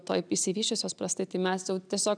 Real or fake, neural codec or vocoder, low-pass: real; none; 9.9 kHz